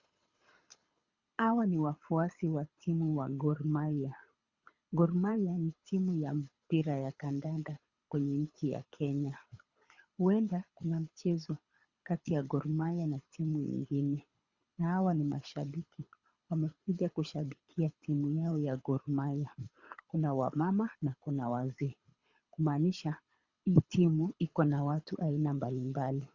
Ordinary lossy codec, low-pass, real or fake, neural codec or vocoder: Opus, 64 kbps; 7.2 kHz; fake; codec, 24 kHz, 6 kbps, HILCodec